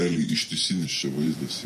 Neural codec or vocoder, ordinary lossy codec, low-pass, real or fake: vocoder, 48 kHz, 128 mel bands, Vocos; MP3, 64 kbps; 14.4 kHz; fake